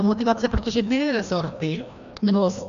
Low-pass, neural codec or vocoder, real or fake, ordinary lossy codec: 7.2 kHz; codec, 16 kHz, 1 kbps, FreqCodec, larger model; fake; MP3, 96 kbps